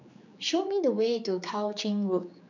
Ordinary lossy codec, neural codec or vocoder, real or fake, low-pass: none; codec, 16 kHz, 4 kbps, X-Codec, HuBERT features, trained on general audio; fake; 7.2 kHz